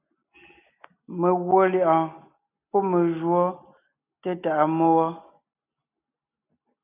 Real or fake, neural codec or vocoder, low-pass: real; none; 3.6 kHz